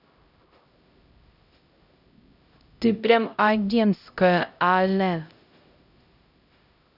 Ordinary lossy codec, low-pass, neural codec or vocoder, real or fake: none; 5.4 kHz; codec, 16 kHz, 0.5 kbps, X-Codec, HuBERT features, trained on LibriSpeech; fake